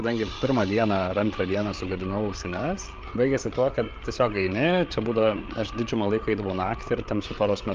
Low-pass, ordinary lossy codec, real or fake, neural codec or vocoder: 7.2 kHz; Opus, 24 kbps; fake; codec, 16 kHz, 8 kbps, FreqCodec, larger model